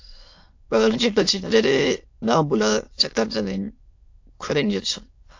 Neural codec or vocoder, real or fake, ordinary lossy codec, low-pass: autoencoder, 22.05 kHz, a latent of 192 numbers a frame, VITS, trained on many speakers; fake; AAC, 48 kbps; 7.2 kHz